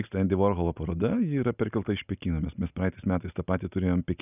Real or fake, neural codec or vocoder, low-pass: real; none; 3.6 kHz